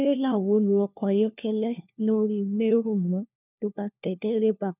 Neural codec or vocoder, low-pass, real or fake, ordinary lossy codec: codec, 16 kHz, 1 kbps, FunCodec, trained on LibriTTS, 50 frames a second; 3.6 kHz; fake; none